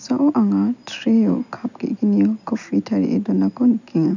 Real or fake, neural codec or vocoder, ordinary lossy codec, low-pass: real; none; none; 7.2 kHz